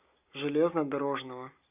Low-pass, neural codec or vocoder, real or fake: 3.6 kHz; none; real